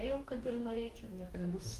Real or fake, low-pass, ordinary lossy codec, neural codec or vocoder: fake; 14.4 kHz; Opus, 32 kbps; codec, 44.1 kHz, 2.6 kbps, DAC